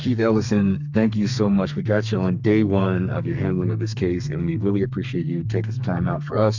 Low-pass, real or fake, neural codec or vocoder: 7.2 kHz; fake; codec, 32 kHz, 1.9 kbps, SNAC